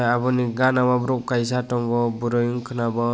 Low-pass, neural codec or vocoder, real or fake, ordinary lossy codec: none; none; real; none